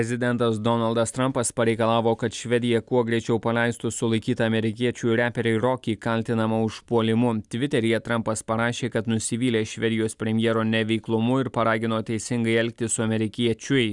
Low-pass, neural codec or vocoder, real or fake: 10.8 kHz; none; real